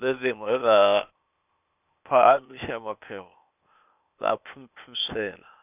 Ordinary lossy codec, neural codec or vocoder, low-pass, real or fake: none; codec, 16 kHz, 0.8 kbps, ZipCodec; 3.6 kHz; fake